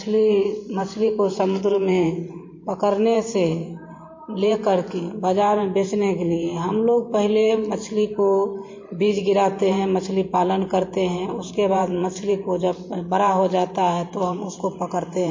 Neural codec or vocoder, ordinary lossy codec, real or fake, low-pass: vocoder, 44.1 kHz, 128 mel bands every 512 samples, BigVGAN v2; MP3, 32 kbps; fake; 7.2 kHz